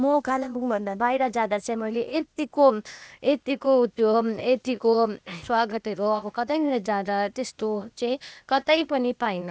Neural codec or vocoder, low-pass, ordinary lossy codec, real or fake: codec, 16 kHz, 0.8 kbps, ZipCodec; none; none; fake